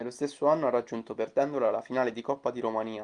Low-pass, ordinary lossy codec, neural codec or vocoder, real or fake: 9.9 kHz; Opus, 24 kbps; none; real